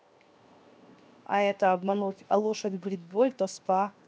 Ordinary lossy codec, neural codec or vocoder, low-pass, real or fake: none; codec, 16 kHz, 0.7 kbps, FocalCodec; none; fake